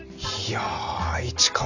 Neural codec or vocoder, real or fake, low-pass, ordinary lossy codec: none; real; 7.2 kHz; none